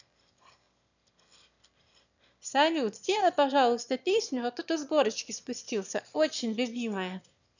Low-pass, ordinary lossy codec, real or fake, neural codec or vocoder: 7.2 kHz; none; fake; autoencoder, 22.05 kHz, a latent of 192 numbers a frame, VITS, trained on one speaker